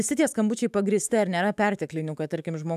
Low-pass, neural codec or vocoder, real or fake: 14.4 kHz; autoencoder, 48 kHz, 128 numbers a frame, DAC-VAE, trained on Japanese speech; fake